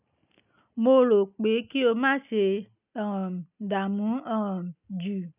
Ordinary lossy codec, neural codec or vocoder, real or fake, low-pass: none; none; real; 3.6 kHz